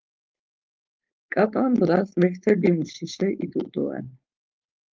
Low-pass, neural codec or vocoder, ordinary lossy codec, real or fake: 7.2 kHz; codec, 16 kHz, 4.8 kbps, FACodec; Opus, 32 kbps; fake